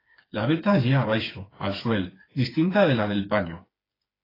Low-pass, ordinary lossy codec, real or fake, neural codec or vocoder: 5.4 kHz; AAC, 24 kbps; fake; codec, 16 kHz, 4 kbps, FreqCodec, smaller model